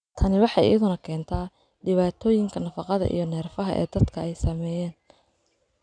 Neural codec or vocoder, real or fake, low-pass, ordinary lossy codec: none; real; 9.9 kHz; none